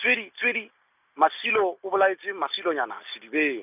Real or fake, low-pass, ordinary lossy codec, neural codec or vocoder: real; 3.6 kHz; none; none